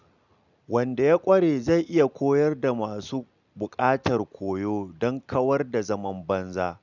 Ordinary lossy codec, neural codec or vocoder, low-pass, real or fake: none; none; 7.2 kHz; real